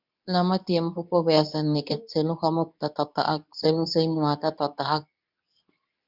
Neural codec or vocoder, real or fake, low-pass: codec, 24 kHz, 0.9 kbps, WavTokenizer, medium speech release version 2; fake; 5.4 kHz